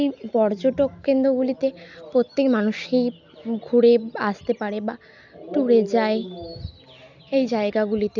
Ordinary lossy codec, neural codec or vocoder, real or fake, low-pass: none; none; real; 7.2 kHz